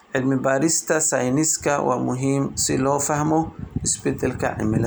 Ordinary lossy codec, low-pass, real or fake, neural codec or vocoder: none; none; real; none